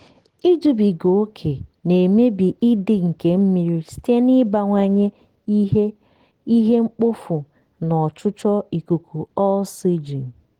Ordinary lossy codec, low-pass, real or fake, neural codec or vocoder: Opus, 16 kbps; 19.8 kHz; real; none